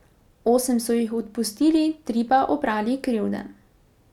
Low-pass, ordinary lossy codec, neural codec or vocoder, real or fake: 19.8 kHz; none; none; real